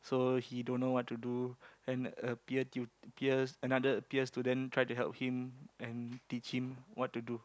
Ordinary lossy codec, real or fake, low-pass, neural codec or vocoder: none; real; none; none